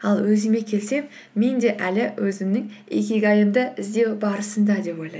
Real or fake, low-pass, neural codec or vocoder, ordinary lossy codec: real; none; none; none